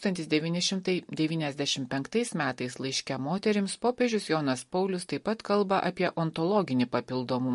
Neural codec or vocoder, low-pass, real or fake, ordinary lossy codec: none; 14.4 kHz; real; MP3, 48 kbps